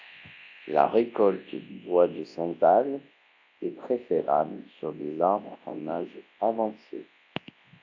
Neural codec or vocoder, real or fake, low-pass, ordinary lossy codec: codec, 24 kHz, 0.9 kbps, WavTokenizer, large speech release; fake; 7.2 kHz; AAC, 48 kbps